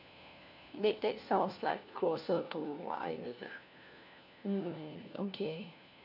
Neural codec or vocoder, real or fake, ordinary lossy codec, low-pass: codec, 16 kHz, 1 kbps, FunCodec, trained on LibriTTS, 50 frames a second; fake; none; 5.4 kHz